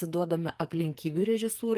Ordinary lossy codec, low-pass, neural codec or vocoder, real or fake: Opus, 32 kbps; 14.4 kHz; codec, 44.1 kHz, 2.6 kbps, SNAC; fake